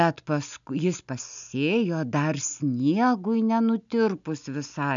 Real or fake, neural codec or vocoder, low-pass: real; none; 7.2 kHz